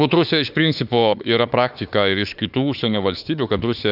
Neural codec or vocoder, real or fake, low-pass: autoencoder, 48 kHz, 32 numbers a frame, DAC-VAE, trained on Japanese speech; fake; 5.4 kHz